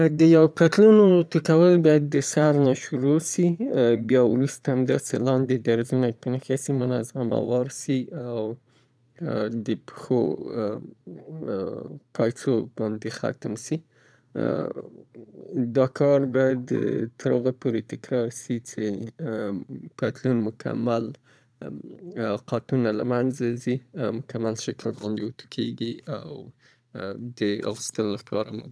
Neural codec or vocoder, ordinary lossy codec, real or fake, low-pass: vocoder, 22.05 kHz, 80 mel bands, Vocos; none; fake; none